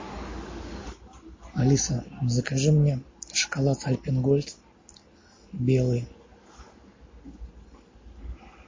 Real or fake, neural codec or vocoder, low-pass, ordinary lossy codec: real; none; 7.2 kHz; MP3, 32 kbps